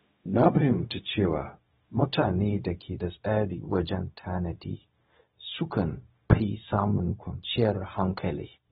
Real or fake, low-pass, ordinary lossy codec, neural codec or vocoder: fake; 7.2 kHz; AAC, 16 kbps; codec, 16 kHz, 0.4 kbps, LongCat-Audio-Codec